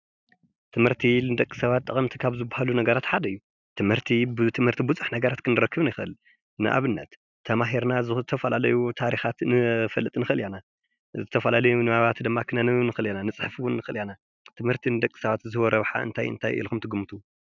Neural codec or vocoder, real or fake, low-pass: none; real; 7.2 kHz